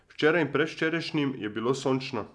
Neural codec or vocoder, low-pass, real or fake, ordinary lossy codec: none; none; real; none